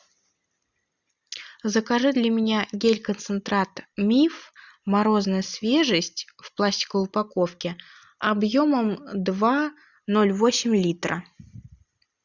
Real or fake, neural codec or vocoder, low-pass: real; none; 7.2 kHz